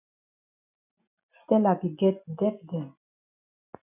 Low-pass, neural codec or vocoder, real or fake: 3.6 kHz; none; real